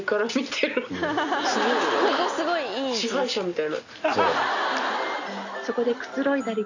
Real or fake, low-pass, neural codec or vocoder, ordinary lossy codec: real; 7.2 kHz; none; none